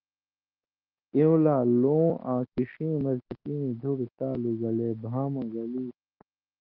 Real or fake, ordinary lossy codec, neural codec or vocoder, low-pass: real; Opus, 16 kbps; none; 5.4 kHz